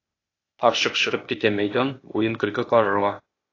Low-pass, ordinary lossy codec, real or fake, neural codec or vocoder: 7.2 kHz; AAC, 32 kbps; fake; codec, 16 kHz, 0.8 kbps, ZipCodec